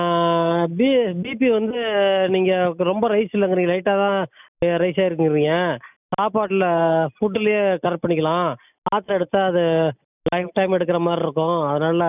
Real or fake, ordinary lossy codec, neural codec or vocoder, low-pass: real; none; none; 3.6 kHz